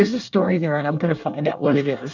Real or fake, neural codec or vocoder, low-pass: fake; codec, 24 kHz, 1 kbps, SNAC; 7.2 kHz